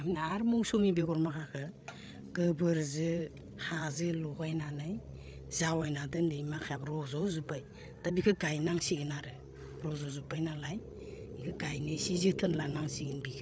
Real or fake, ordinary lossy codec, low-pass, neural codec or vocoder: fake; none; none; codec, 16 kHz, 8 kbps, FreqCodec, larger model